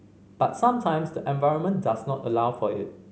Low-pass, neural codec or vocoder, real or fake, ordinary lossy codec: none; none; real; none